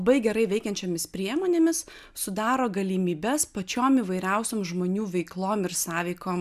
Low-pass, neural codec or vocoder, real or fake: 14.4 kHz; none; real